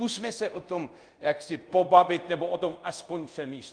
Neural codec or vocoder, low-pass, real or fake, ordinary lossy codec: codec, 24 kHz, 0.5 kbps, DualCodec; 9.9 kHz; fake; Opus, 32 kbps